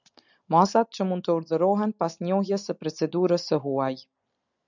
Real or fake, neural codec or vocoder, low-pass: real; none; 7.2 kHz